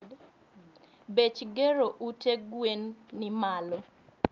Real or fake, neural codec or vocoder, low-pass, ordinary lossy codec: real; none; 7.2 kHz; Opus, 24 kbps